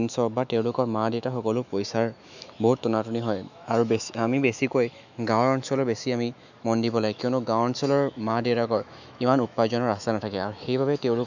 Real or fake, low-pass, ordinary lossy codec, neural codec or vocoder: fake; 7.2 kHz; none; autoencoder, 48 kHz, 128 numbers a frame, DAC-VAE, trained on Japanese speech